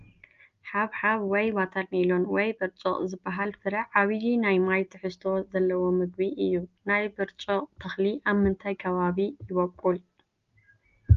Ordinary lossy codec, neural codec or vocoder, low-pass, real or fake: Opus, 32 kbps; none; 7.2 kHz; real